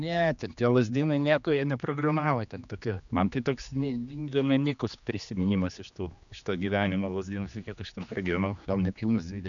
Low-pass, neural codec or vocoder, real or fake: 7.2 kHz; codec, 16 kHz, 2 kbps, X-Codec, HuBERT features, trained on general audio; fake